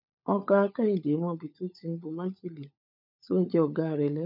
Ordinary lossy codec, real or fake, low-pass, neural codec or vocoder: none; fake; 5.4 kHz; codec, 16 kHz, 16 kbps, FunCodec, trained on LibriTTS, 50 frames a second